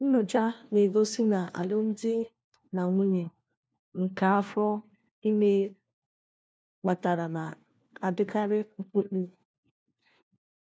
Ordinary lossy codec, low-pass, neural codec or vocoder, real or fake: none; none; codec, 16 kHz, 1 kbps, FunCodec, trained on LibriTTS, 50 frames a second; fake